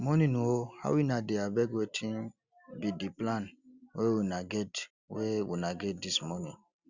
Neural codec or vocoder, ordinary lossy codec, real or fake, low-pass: none; Opus, 64 kbps; real; 7.2 kHz